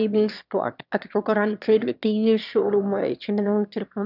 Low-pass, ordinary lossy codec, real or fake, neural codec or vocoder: 5.4 kHz; none; fake; autoencoder, 22.05 kHz, a latent of 192 numbers a frame, VITS, trained on one speaker